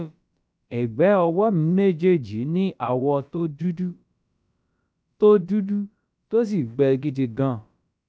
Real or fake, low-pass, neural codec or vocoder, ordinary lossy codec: fake; none; codec, 16 kHz, about 1 kbps, DyCAST, with the encoder's durations; none